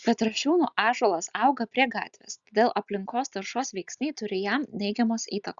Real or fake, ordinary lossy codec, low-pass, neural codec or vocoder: real; Opus, 64 kbps; 7.2 kHz; none